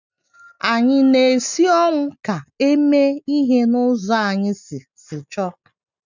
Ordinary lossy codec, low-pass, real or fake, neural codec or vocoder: none; 7.2 kHz; real; none